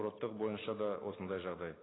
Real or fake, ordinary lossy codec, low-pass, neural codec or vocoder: real; AAC, 16 kbps; 7.2 kHz; none